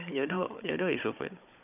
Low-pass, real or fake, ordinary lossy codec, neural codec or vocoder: 3.6 kHz; fake; none; codec, 16 kHz, 16 kbps, FunCodec, trained on Chinese and English, 50 frames a second